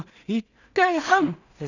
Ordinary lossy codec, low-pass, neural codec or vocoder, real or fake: none; 7.2 kHz; codec, 16 kHz in and 24 kHz out, 0.4 kbps, LongCat-Audio-Codec, two codebook decoder; fake